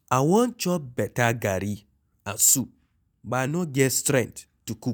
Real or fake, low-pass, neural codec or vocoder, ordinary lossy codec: real; none; none; none